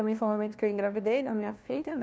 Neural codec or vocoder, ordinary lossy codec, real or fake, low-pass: codec, 16 kHz, 1 kbps, FunCodec, trained on LibriTTS, 50 frames a second; none; fake; none